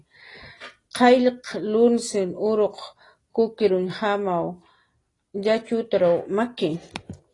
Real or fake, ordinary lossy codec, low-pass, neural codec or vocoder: real; AAC, 32 kbps; 10.8 kHz; none